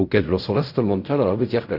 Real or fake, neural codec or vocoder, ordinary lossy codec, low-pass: fake; codec, 16 kHz in and 24 kHz out, 0.4 kbps, LongCat-Audio-Codec, fine tuned four codebook decoder; none; 5.4 kHz